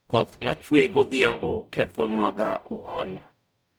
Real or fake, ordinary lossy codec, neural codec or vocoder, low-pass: fake; none; codec, 44.1 kHz, 0.9 kbps, DAC; none